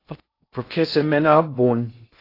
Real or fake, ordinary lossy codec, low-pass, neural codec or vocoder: fake; AAC, 32 kbps; 5.4 kHz; codec, 16 kHz in and 24 kHz out, 0.6 kbps, FocalCodec, streaming, 2048 codes